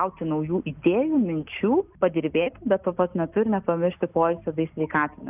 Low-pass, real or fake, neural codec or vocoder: 3.6 kHz; real; none